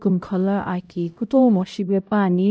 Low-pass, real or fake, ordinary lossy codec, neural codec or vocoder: none; fake; none; codec, 16 kHz, 0.5 kbps, X-Codec, HuBERT features, trained on LibriSpeech